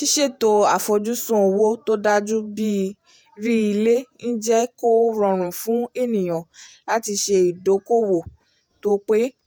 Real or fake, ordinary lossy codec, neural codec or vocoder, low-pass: fake; none; vocoder, 48 kHz, 128 mel bands, Vocos; none